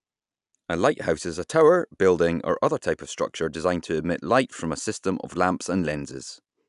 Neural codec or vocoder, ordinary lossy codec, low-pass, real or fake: none; none; 10.8 kHz; real